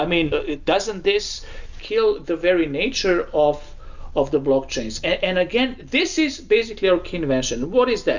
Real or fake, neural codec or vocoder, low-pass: real; none; 7.2 kHz